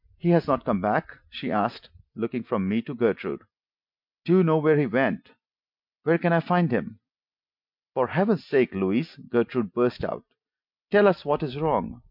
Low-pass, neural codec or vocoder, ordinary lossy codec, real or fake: 5.4 kHz; none; MP3, 48 kbps; real